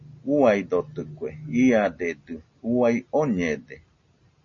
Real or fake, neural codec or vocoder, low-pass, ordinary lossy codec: real; none; 7.2 kHz; MP3, 32 kbps